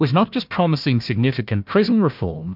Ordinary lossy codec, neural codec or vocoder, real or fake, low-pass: MP3, 48 kbps; codec, 16 kHz, 1 kbps, FunCodec, trained on Chinese and English, 50 frames a second; fake; 5.4 kHz